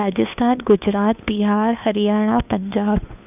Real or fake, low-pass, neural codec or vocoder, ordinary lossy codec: fake; 3.6 kHz; codec, 16 kHz, 2 kbps, FunCodec, trained on Chinese and English, 25 frames a second; none